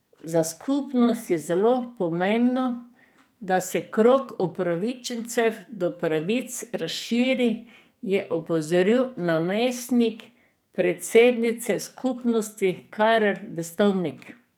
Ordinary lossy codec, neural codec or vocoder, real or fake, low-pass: none; codec, 44.1 kHz, 2.6 kbps, SNAC; fake; none